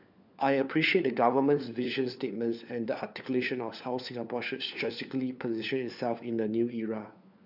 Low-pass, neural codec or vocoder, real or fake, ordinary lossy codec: 5.4 kHz; codec, 16 kHz, 4 kbps, FunCodec, trained on LibriTTS, 50 frames a second; fake; none